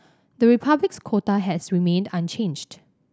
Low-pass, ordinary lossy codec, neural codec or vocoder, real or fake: none; none; none; real